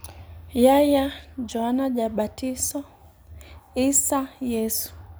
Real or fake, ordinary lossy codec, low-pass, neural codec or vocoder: real; none; none; none